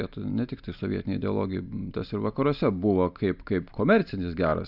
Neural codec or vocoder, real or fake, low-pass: none; real; 5.4 kHz